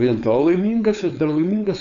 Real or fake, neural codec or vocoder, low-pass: fake; codec, 16 kHz, 4.8 kbps, FACodec; 7.2 kHz